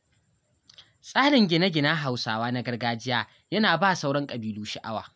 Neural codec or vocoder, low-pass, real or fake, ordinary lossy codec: none; none; real; none